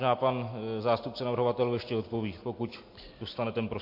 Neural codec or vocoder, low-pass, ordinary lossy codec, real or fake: none; 5.4 kHz; MP3, 32 kbps; real